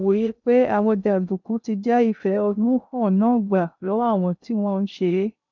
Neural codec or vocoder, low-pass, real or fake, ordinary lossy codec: codec, 16 kHz in and 24 kHz out, 0.6 kbps, FocalCodec, streaming, 2048 codes; 7.2 kHz; fake; none